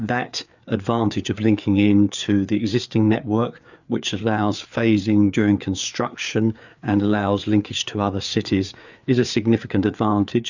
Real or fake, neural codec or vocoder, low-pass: fake; codec, 16 kHz, 4 kbps, FreqCodec, larger model; 7.2 kHz